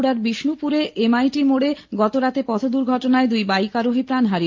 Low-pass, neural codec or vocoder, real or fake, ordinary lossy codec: 7.2 kHz; none; real; Opus, 24 kbps